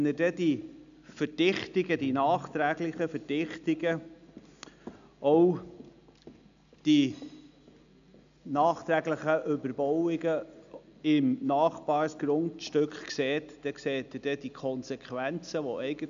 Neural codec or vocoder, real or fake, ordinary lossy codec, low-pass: none; real; AAC, 96 kbps; 7.2 kHz